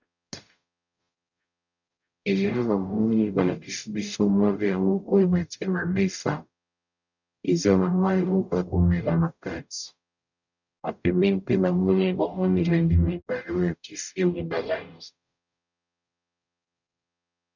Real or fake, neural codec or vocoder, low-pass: fake; codec, 44.1 kHz, 0.9 kbps, DAC; 7.2 kHz